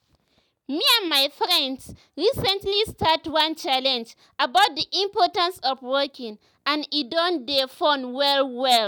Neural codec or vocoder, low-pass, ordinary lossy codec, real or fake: none; none; none; real